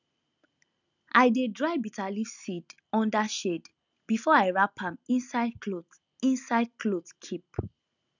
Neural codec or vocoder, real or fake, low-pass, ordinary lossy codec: none; real; 7.2 kHz; none